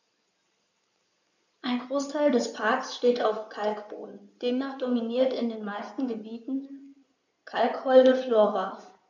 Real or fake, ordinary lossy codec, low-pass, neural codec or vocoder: fake; none; 7.2 kHz; codec, 16 kHz in and 24 kHz out, 2.2 kbps, FireRedTTS-2 codec